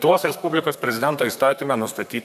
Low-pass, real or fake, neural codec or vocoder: 14.4 kHz; fake; codec, 32 kHz, 1.9 kbps, SNAC